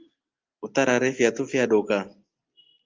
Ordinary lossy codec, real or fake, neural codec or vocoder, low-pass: Opus, 24 kbps; real; none; 7.2 kHz